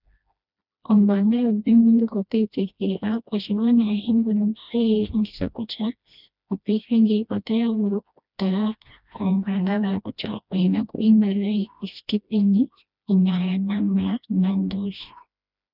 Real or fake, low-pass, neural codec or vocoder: fake; 5.4 kHz; codec, 16 kHz, 1 kbps, FreqCodec, smaller model